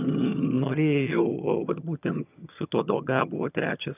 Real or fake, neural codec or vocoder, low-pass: fake; vocoder, 22.05 kHz, 80 mel bands, HiFi-GAN; 3.6 kHz